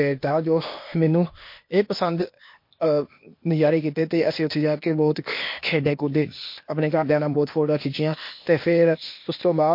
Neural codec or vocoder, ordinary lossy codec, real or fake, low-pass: codec, 16 kHz, 0.8 kbps, ZipCodec; MP3, 32 kbps; fake; 5.4 kHz